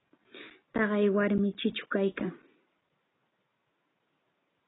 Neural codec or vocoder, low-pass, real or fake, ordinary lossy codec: none; 7.2 kHz; real; AAC, 16 kbps